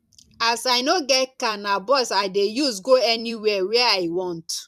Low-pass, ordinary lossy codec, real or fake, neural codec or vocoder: 14.4 kHz; none; fake; vocoder, 44.1 kHz, 128 mel bands every 256 samples, BigVGAN v2